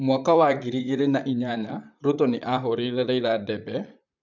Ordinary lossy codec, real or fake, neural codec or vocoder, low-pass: MP3, 64 kbps; fake; vocoder, 44.1 kHz, 128 mel bands, Pupu-Vocoder; 7.2 kHz